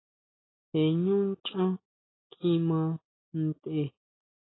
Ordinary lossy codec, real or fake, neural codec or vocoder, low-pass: AAC, 16 kbps; real; none; 7.2 kHz